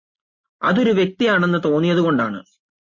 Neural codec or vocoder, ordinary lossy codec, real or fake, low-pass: none; MP3, 32 kbps; real; 7.2 kHz